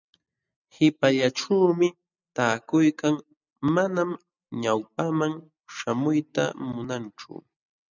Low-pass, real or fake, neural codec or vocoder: 7.2 kHz; real; none